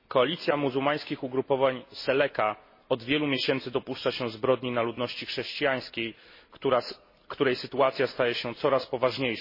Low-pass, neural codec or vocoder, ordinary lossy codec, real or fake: 5.4 kHz; none; MP3, 24 kbps; real